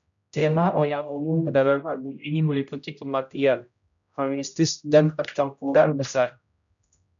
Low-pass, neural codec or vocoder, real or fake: 7.2 kHz; codec, 16 kHz, 0.5 kbps, X-Codec, HuBERT features, trained on general audio; fake